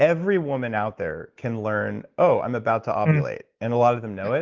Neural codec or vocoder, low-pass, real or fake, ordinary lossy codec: none; 7.2 kHz; real; Opus, 16 kbps